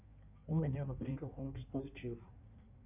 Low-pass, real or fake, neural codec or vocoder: 3.6 kHz; fake; codec, 16 kHz in and 24 kHz out, 1.1 kbps, FireRedTTS-2 codec